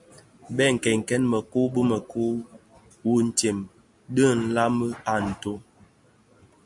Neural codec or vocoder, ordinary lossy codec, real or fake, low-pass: none; MP3, 64 kbps; real; 10.8 kHz